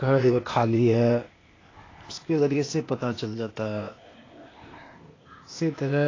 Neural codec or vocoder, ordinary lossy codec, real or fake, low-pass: codec, 16 kHz, 0.8 kbps, ZipCodec; AAC, 32 kbps; fake; 7.2 kHz